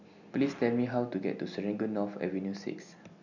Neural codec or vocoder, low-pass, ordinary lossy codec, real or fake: none; 7.2 kHz; none; real